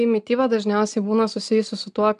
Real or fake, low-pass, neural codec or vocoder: real; 10.8 kHz; none